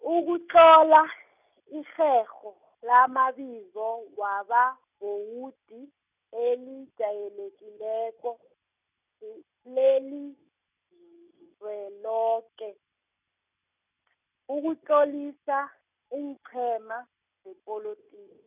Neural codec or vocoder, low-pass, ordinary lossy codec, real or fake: none; 3.6 kHz; none; real